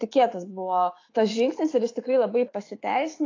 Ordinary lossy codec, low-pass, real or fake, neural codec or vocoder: AAC, 32 kbps; 7.2 kHz; fake; autoencoder, 48 kHz, 128 numbers a frame, DAC-VAE, trained on Japanese speech